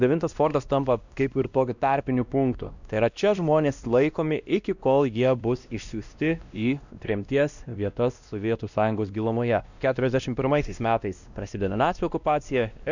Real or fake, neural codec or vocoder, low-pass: fake; codec, 16 kHz, 1 kbps, X-Codec, WavLM features, trained on Multilingual LibriSpeech; 7.2 kHz